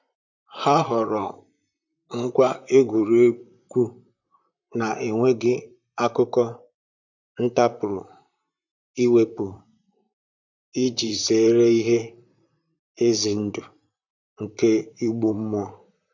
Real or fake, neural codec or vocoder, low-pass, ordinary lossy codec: fake; vocoder, 44.1 kHz, 128 mel bands, Pupu-Vocoder; 7.2 kHz; none